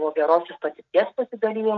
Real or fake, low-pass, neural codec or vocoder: real; 7.2 kHz; none